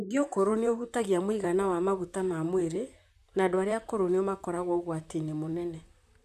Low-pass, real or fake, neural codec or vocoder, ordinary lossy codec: 14.4 kHz; fake; vocoder, 44.1 kHz, 128 mel bands, Pupu-Vocoder; none